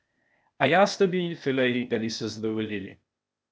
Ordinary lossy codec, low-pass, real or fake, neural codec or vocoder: none; none; fake; codec, 16 kHz, 0.8 kbps, ZipCodec